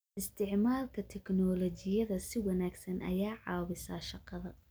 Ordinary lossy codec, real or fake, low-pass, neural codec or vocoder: none; real; none; none